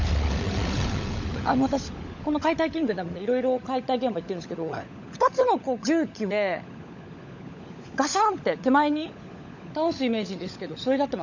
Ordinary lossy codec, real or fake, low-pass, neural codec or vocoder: none; fake; 7.2 kHz; codec, 16 kHz, 16 kbps, FunCodec, trained on LibriTTS, 50 frames a second